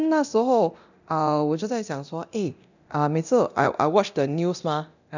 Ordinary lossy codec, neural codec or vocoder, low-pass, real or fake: none; codec, 24 kHz, 0.9 kbps, DualCodec; 7.2 kHz; fake